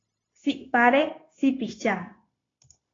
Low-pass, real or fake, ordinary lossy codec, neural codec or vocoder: 7.2 kHz; fake; AAC, 32 kbps; codec, 16 kHz, 0.9 kbps, LongCat-Audio-Codec